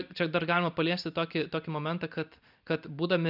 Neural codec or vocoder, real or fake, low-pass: none; real; 5.4 kHz